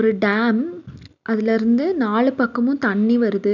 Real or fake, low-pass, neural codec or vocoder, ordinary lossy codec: real; 7.2 kHz; none; none